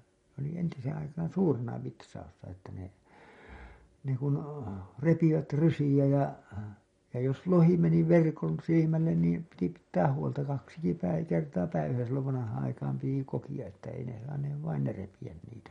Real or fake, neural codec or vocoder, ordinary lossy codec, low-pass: real; none; MP3, 48 kbps; 10.8 kHz